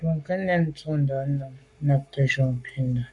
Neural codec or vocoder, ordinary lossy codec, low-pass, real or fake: codec, 44.1 kHz, 7.8 kbps, Pupu-Codec; MP3, 96 kbps; 10.8 kHz; fake